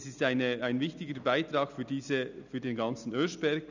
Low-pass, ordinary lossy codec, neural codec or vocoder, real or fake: 7.2 kHz; none; none; real